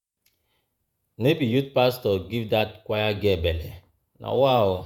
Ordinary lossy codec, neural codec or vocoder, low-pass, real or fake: none; vocoder, 48 kHz, 128 mel bands, Vocos; 19.8 kHz; fake